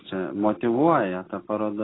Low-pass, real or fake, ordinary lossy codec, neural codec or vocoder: 7.2 kHz; real; AAC, 16 kbps; none